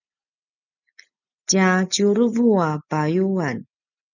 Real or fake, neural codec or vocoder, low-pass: real; none; 7.2 kHz